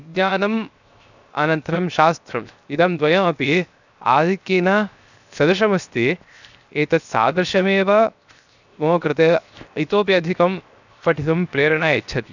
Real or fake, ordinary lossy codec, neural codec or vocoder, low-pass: fake; none; codec, 16 kHz, 0.7 kbps, FocalCodec; 7.2 kHz